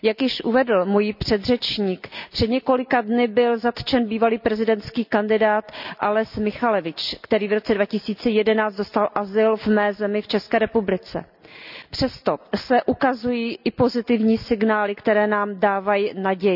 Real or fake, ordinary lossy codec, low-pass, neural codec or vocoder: real; none; 5.4 kHz; none